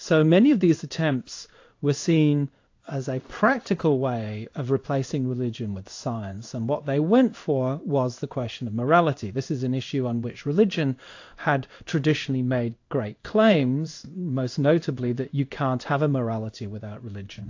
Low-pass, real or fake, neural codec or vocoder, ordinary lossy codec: 7.2 kHz; fake; codec, 16 kHz in and 24 kHz out, 1 kbps, XY-Tokenizer; AAC, 48 kbps